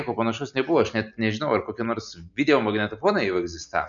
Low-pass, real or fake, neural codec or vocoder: 7.2 kHz; real; none